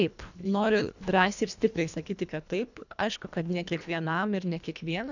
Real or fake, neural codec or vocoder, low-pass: fake; codec, 24 kHz, 1.5 kbps, HILCodec; 7.2 kHz